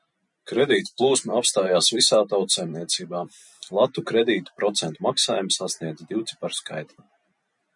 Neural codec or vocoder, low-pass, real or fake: none; 10.8 kHz; real